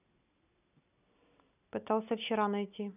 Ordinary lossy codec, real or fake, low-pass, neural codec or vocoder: none; real; 3.6 kHz; none